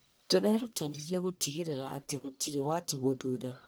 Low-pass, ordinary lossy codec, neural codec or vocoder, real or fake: none; none; codec, 44.1 kHz, 1.7 kbps, Pupu-Codec; fake